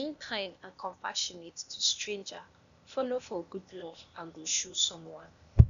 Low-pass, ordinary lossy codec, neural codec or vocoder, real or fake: 7.2 kHz; none; codec, 16 kHz, 0.8 kbps, ZipCodec; fake